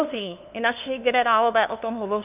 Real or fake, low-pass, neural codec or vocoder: fake; 3.6 kHz; codec, 16 kHz, 2 kbps, FunCodec, trained on LibriTTS, 25 frames a second